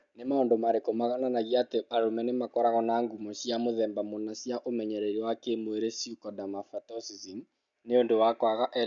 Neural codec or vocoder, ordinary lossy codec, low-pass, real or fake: none; none; 7.2 kHz; real